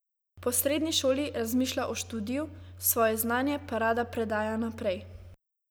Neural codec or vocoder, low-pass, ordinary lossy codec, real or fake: none; none; none; real